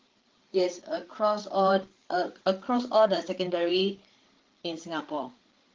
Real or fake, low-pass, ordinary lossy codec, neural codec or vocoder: fake; 7.2 kHz; Opus, 16 kbps; codec, 16 kHz, 8 kbps, FreqCodec, larger model